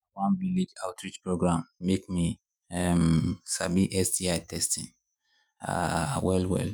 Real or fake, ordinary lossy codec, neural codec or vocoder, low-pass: fake; none; autoencoder, 48 kHz, 128 numbers a frame, DAC-VAE, trained on Japanese speech; none